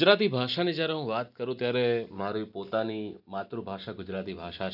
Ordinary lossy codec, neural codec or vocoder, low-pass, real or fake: none; none; 5.4 kHz; real